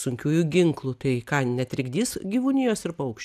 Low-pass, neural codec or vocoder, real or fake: 14.4 kHz; none; real